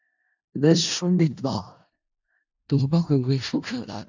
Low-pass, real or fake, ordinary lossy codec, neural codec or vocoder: 7.2 kHz; fake; AAC, 48 kbps; codec, 16 kHz in and 24 kHz out, 0.4 kbps, LongCat-Audio-Codec, four codebook decoder